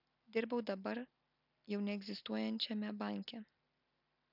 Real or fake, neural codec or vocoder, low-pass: real; none; 5.4 kHz